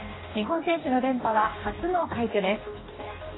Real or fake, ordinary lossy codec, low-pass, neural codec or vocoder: fake; AAC, 16 kbps; 7.2 kHz; codec, 44.1 kHz, 2.6 kbps, DAC